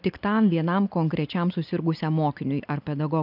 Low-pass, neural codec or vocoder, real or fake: 5.4 kHz; none; real